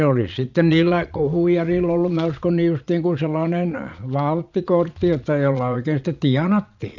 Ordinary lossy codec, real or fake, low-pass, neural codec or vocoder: none; fake; 7.2 kHz; vocoder, 22.05 kHz, 80 mel bands, Vocos